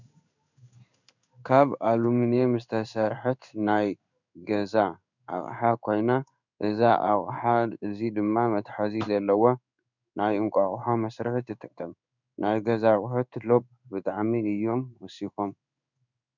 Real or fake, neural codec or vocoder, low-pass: fake; codec, 16 kHz in and 24 kHz out, 1 kbps, XY-Tokenizer; 7.2 kHz